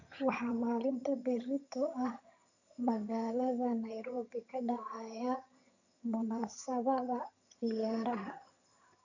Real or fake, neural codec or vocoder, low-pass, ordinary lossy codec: fake; vocoder, 22.05 kHz, 80 mel bands, HiFi-GAN; 7.2 kHz; none